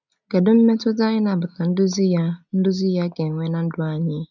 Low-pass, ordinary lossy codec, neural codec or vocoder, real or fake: 7.2 kHz; none; none; real